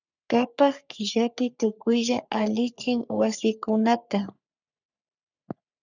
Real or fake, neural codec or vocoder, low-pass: fake; codec, 44.1 kHz, 3.4 kbps, Pupu-Codec; 7.2 kHz